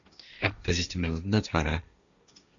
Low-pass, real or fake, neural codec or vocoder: 7.2 kHz; fake; codec, 16 kHz, 1.1 kbps, Voila-Tokenizer